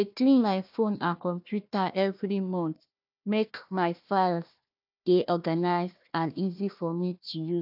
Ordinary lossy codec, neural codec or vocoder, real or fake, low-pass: AAC, 48 kbps; codec, 16 kHz, 1 kbps, FunCodec, trained on Chinese and English, 50 frames a second; fake; 5.4 kHz